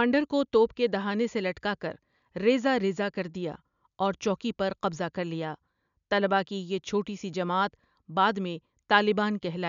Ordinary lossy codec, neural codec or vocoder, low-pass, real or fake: none; none; 7.2 kHz; real